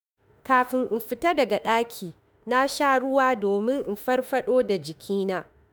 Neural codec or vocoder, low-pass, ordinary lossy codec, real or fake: autoencoder, 48 kHz, 32 numbers a frame, DAC-VAE, trained on Japanese speech; none; none; fake